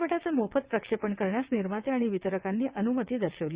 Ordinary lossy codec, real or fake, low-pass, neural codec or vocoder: Opus, 64 kbps; fake; 3.6 kHz; vocoder, 22.05 kHz, 80 mel bands, WaveNeXt